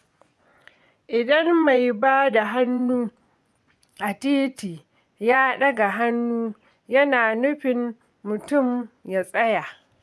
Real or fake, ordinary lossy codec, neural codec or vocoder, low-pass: fake; none; vocoder, 24 kHz, 100 mel bands, Vocos; none